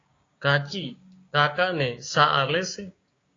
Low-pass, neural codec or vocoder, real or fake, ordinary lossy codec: 7.2 kHz; codec, 16 kHz, 6 kbps, DAC; fake; AAC, 32 kbps